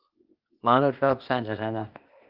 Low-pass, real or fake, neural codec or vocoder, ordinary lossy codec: 5.4 kHz; fake; codec, 16 kHz, 0.8 kbps, ZipCodec; Opus, 24 kbps